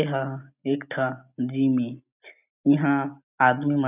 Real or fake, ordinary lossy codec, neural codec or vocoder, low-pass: real; none; none; 3.6 kHz